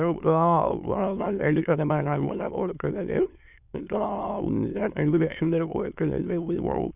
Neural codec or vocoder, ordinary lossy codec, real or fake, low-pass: autoencoder, 22.05 kHz, a latent of 192 numbers a frame, VITS, trained on many speakers; none; fake; 3.6 kHz